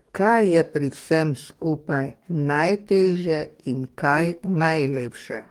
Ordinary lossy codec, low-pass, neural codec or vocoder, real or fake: Opus, 32 kbps; 19.8 kHz; codec, 44.1 kHz, 2.6 kbps, DAC; fake